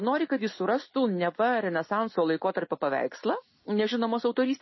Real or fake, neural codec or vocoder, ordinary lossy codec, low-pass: real; none; MP3, 24 kbps; 7.2 kHz